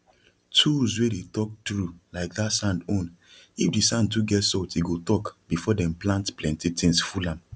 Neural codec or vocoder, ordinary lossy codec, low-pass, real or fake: none; none; none; real